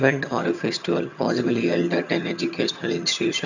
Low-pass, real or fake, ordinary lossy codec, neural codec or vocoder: 7.2 kHz; fake; none; vocoder, 22.05 kHz, 80 mel bands, HiFi-GAN